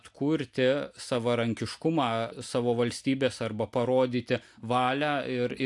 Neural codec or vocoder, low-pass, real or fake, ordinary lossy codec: none; 10.8 kHz; real; AAC, 64 kbps